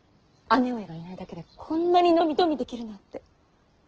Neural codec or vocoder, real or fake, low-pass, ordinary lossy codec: none; real; 7.2 kHz; Opus, 16 kbps